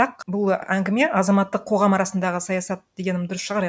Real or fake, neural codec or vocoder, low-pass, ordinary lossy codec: real; none; none; none